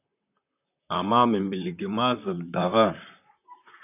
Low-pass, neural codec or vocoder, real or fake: 3.6 kHz; vocoder, 44.1 kHz, 128 mel bands, Pupu-Vocoder; fake